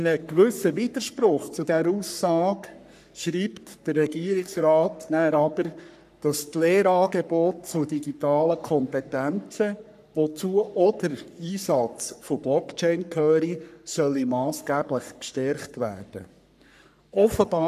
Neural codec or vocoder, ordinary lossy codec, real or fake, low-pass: codec, 44.1 kHz, 3.4 kbps, Pupu-Codec; none; fake; 14.4 kHz